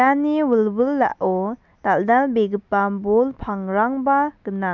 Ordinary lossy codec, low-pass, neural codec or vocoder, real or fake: none; 7.2 kHz; none; real